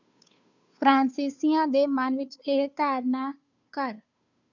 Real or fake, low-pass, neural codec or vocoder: fake; 7.2 kHz; codec, 16 kHz, 2 kbps, FunCodec, trained on Chinese and English, 25 frames a second